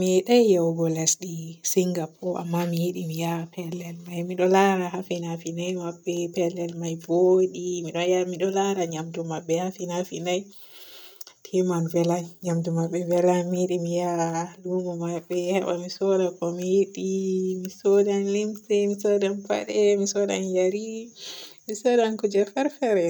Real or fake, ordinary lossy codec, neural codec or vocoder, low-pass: real; none; none; none